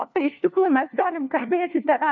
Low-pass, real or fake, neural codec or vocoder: 7.2 kHz; fake; codec, 16 kHz, 1 kbps, FunCodec, trained on LibriTTS, 50 frames a second